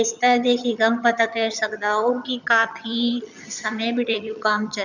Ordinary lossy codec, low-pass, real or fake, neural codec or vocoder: none; 7.2 kHz; fake; vocoder, 22.05 kHz, 80 mel bands, HiFi-GAN